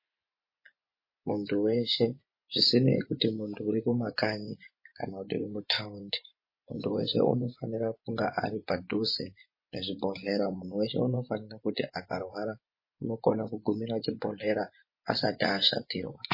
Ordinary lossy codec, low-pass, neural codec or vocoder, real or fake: MP3, 24 kbps; 5.4 kHz; vocoder, 24 kHz, 100 mel bands, Vocos; fake